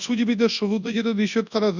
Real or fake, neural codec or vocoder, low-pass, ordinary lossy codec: fake; codec, 24 kHz, 0.9 kbps, WavTokenizer, large speech release; 7.2 kHz; none